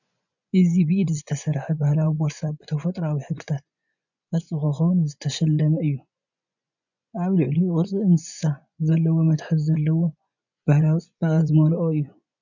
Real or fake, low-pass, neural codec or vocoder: fake; 7.2 kHz; vocoder, 24 kHz, 100 mel bands, Vocos